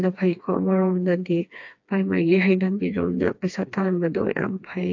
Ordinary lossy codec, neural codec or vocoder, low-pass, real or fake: AAC, 48 kbps; codec, 16 kHz, 2 kbps, FreqCodec, smaller model; 7.2 kHz; fake